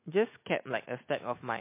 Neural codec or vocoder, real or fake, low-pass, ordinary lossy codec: none; real; 3.6 kHz; MP3, 24 kbps